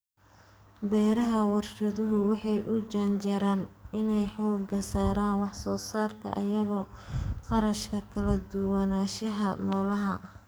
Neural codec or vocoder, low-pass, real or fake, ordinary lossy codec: codec, 44.1 kHz, 2.6 kbps, SNAC; none; fake; none